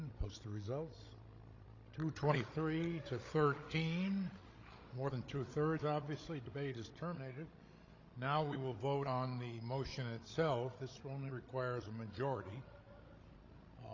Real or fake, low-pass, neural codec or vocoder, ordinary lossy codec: fake; 7.2 kHz; codec, 16 kHz, 16 kbps, FreqCodec, larger model; AAC, 32 kbps